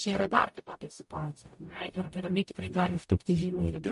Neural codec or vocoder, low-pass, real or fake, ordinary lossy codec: codec, 44.1 kHz, 0.9 kbps, DAC; 14.4 kHz; fake; MP3, 48 kbps